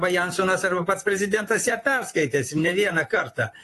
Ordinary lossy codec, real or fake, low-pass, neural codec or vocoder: AAC, 48 kbps; fake; 14.4 kHz; vocoder, 44.1 kHz, 128 mel bands, Pupu-Vocoder